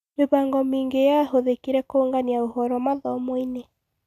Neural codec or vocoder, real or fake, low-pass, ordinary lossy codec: none; real; 14.4 kHz; none